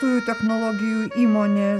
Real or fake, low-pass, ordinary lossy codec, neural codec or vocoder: real; 14.4 kHz; MP3, 64 kbps; none